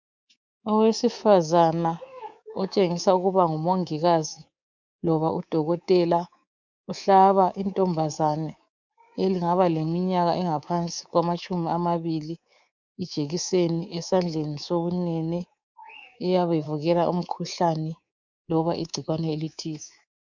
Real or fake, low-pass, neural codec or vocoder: fake; 7.2 kHz; codec, 24 kHz, 3.1 kbps, DualCodec